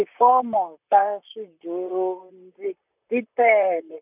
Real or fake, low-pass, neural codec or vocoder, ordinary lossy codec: fake; 3.6 kHz; vocoder, 44.1 kHz, 128 mel bands, Pupu-Vocoder; none